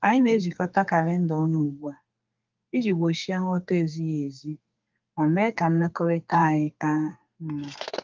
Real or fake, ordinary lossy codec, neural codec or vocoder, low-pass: fake; Opus, 24 kbps; codec, 32 kHz, 1.9 kbps, SNAC; 7.2 kHz